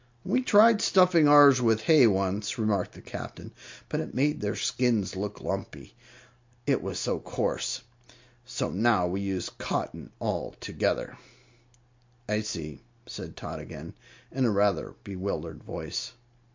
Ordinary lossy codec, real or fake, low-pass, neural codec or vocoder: MP3, 48 kbps; real; 7.2 kHz; none